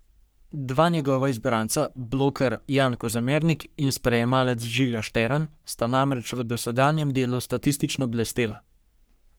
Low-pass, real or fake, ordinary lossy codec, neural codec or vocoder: none; fake; none; codec, 44.1 kHz, 3.4 kbps, Pupu-Codec